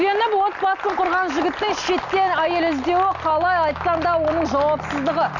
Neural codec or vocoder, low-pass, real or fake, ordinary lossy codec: none; 7.2 kHz; real; none